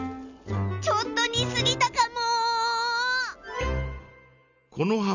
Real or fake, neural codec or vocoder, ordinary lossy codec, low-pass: real; none; none; 7.2 kHz